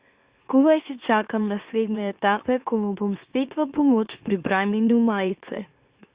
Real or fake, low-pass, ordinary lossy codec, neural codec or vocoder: fake; 3.6 kHz; Opus, 64 kbps; autoencoder, 44.1 kHz, a latent of 192 numbers a frame, MeloTTS